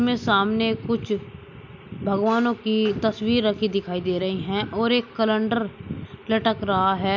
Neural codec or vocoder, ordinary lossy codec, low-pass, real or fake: none; MP3, 64 kbps; 7.2 kHz; real